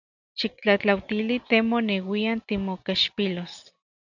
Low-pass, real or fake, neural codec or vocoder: 7.2 kHz; real; none